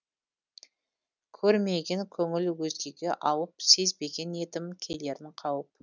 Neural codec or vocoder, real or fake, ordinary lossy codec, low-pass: none; real; none; 7.2 kHz